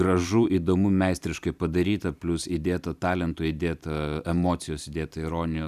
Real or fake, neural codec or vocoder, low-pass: fake; vocoder, 44.1 kHz, 128 mel bands every 256 samples, BigVGAN v2; 14.4 kHz